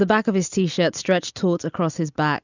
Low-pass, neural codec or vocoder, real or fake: 7.2 kHz; none; real